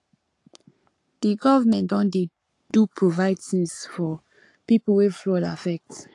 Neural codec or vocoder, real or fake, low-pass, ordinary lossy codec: codec, 44.1 kHz, 7.8 kbps, Pupu-Codec; fake; 10.8 kHz; AAC, 48 kbps